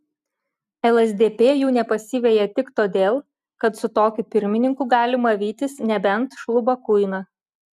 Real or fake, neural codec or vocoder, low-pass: real; none; 14.4 kHz